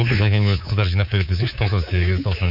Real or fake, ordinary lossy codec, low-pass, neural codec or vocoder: fake; none; 5.4 kHz; codec, 24 kHz, 3.1 kbps, DualCodec